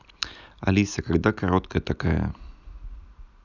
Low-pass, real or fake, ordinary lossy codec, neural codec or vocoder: 7.2 kHz; real; none; none